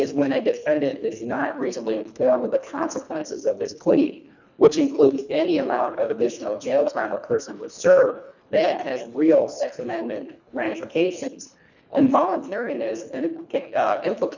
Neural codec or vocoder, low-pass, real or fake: codec, 24 kHz, 1.5 kbps, HILCodec; 7.2 kHz; fake